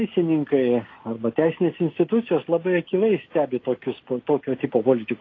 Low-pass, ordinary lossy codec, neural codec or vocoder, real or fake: 7.2 kHz; AAC, 32 kbps; none; real